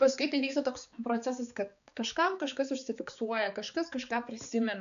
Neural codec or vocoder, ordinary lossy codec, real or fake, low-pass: codec, 16 kHz, 4 kbps, X-Codec, HuBERT features, trained on balanced general audio; MP3, 96 kbps; fake; 7.2 kHz